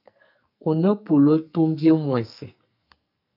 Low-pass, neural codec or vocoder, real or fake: 5.4 kHz; codec, 44.1 kHz, 2.6 kbps, SNAC; fake